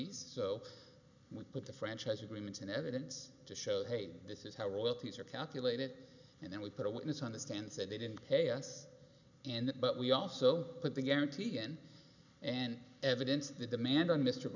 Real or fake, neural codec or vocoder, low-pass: real; none; 7.2 kHz